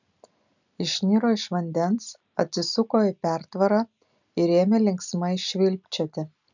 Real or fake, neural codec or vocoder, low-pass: real; none; 7.2 kHz